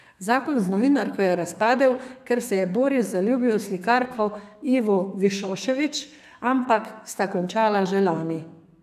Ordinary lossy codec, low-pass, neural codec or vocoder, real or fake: none; 14.4 kHz; codec, 32 kHz, 1.9 kbps, SNAC; fake